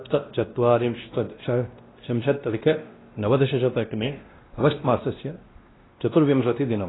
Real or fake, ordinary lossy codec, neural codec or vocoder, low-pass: fake; AAC, 16 kbps; codec, 16 kHz, 1 kbps, X-Codec, WavLM features, trained on Multilingual LibriSpeech; 7.2 kHz